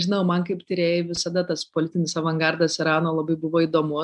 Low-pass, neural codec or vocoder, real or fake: 10.8 kHz; none; real